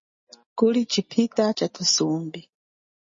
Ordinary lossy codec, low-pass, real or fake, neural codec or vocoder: MP3, 32 kbps; 7.2 kHz; real; none